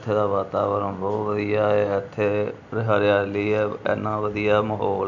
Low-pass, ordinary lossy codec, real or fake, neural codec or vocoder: 7.2 kHz; none; real; none